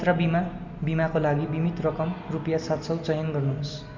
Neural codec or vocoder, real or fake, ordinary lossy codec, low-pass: none; real; MP3, 64 kbps; 7.2 kHz